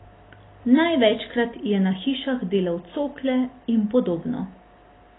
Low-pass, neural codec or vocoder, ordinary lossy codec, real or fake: 7.2 kHz; none; AAC, 16 kbps; real